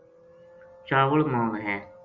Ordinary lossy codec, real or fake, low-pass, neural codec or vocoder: Opus, 32 kbps; real; 7.2 kHz; none